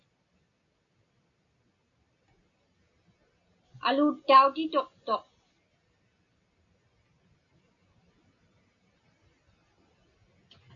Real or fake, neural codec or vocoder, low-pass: real; none; 7.2 kHz